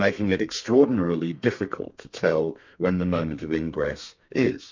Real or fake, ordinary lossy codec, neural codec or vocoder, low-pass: fake; MP3, 64 kbps; codec, 32 kHz, 1.9 kbps, SNAC; 7.2 kHz